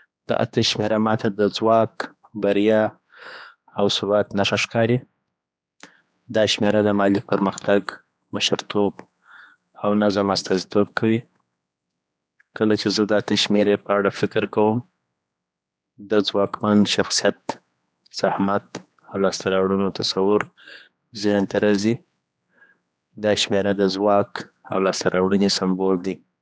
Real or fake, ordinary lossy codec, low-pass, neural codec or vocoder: fake; none; none; codec, 16 kHz, 2 kbps, X-Codec, HuBERT features, trained on general audio